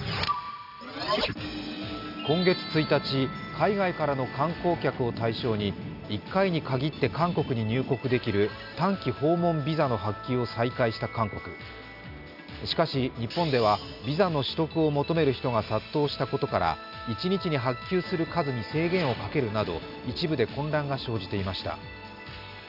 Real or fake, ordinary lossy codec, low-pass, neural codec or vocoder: real; none; 5.4 kHz; none